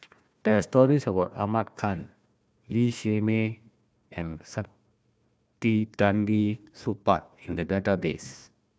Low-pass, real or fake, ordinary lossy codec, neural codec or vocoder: none; fake; none; codec, 16 kHz, 1 kbps, FunCodec, trained on Chinese and English, 50 frames a second